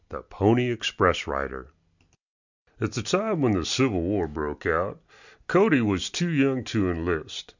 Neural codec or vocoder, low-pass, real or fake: none; 7.2 kHz; real